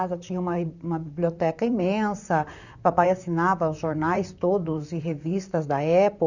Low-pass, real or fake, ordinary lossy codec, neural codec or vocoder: 7.2 kHz; fake; none; vocoder, 44.1 kHz, 128 mel bands, Pupu-Vocoder